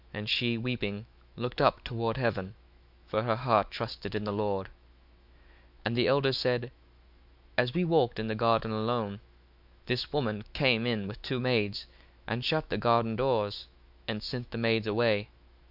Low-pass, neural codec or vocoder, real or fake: 5.4 kHz; autoencoder, 48 kHz, 128 numbers a frame, DAC-VAE, trained on Japanese speech; fake